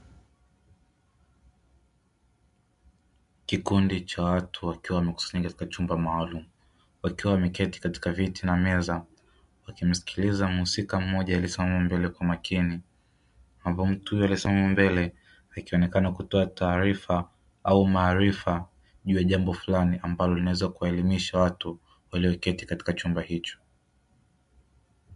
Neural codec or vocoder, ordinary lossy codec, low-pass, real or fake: none; MP3, 64 kbps; 10.8 kHz; real